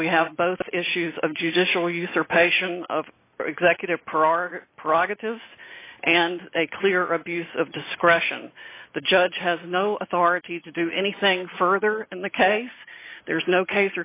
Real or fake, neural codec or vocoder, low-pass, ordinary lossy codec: real; none; 3.6 kHz; AAC, 24 kbps